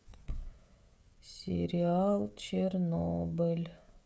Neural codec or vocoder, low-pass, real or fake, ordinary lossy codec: codec, 16 kHz, 16 kbps, FreqCodec, smaller model; none; fake; none